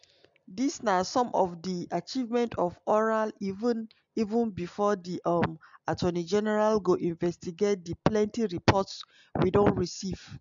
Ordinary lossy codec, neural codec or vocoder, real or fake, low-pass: MP3, 64 kbps; none; real; 7.2 kHz